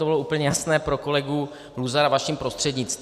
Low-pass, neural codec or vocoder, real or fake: 14.4 kHz; none; real